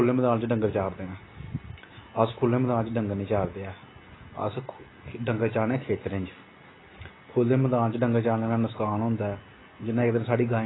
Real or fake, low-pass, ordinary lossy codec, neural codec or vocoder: real; 7.2 kHz; AAC, 16 kbps; none